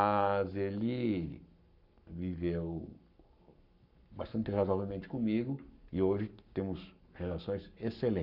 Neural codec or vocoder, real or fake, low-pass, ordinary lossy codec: codec, 44.1 kHz, 7.8 kbps, Pupu-Codec; fake; 5.4 kHz; none